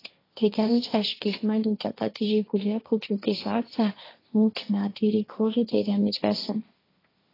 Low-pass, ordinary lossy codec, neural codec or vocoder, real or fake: 5.4 kHz; AAC, 24 kbps; codec, 16 kHz, 1.1 kbps, Voila-Tokenizer; fake